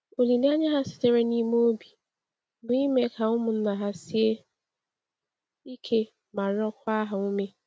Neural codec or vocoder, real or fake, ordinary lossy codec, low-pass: none; real; none; none